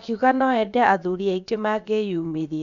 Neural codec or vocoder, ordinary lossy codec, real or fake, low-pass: codec, 16 kHz, about 1 kbps, DyCAST, with the encoder's durations; none; fake; 7.2 kHz